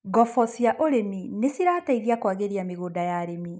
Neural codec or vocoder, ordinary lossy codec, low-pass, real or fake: none; none; none; real